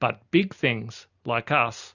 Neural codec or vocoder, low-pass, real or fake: none; 7.2 kHz; real